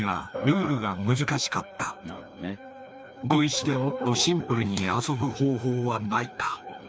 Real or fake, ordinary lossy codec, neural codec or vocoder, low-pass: fake; none; codec, 16 kHz, 2 kbps, FreqCodec, larger model; none